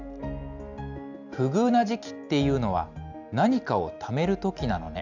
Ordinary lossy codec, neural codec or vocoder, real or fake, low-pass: none; none; real; 7.2 kHz